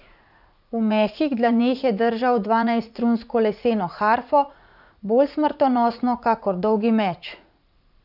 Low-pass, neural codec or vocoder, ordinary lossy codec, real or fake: 5.4 kHz; vocoder, 44.1 kHz, 80 mel bands, Vocos; none; fake